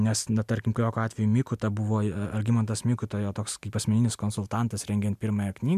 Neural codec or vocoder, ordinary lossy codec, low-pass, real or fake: none; MP3, 96 kbps; 14.4 kHz; real